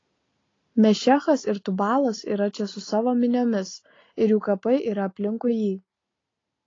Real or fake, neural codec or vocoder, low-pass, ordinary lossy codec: real; none; 7.2 kHz; AAC, 32 kbps